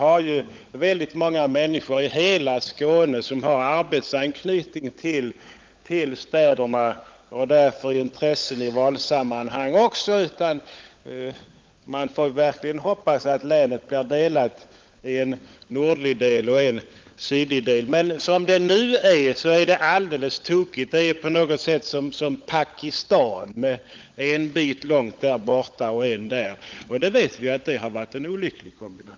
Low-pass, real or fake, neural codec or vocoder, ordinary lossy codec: 7.2 kHz; fake; codec, 16 kHz, 16 kbps, FunCodec, trained on LibriTTS, 50 frames a second; Opus, 24 kbps